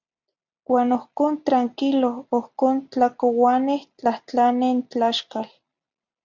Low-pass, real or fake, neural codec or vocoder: 7.2 kHz; real; none